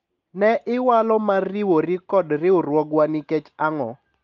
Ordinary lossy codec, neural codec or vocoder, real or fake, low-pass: Opus, 32 kbps; none; real; 7.2 kHz